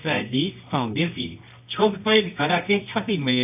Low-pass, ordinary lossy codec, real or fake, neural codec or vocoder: 3.6 kHz; AAC, 32 kbps; fake; codec, 24 kHz, 0.9 kbps, WavTokenizer, medium music audio release